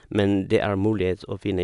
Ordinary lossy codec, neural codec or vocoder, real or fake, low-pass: none; none; real; 10.8 kHz